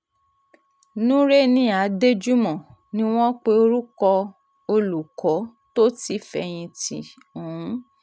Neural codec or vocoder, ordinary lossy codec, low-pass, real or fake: none; none; none; real